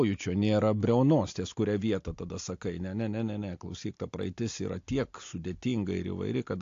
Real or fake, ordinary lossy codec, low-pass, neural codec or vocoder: real; AAC, 48 kbps; 7.2 kHz; none